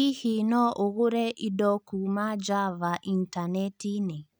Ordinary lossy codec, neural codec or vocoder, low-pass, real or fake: none; none; none; real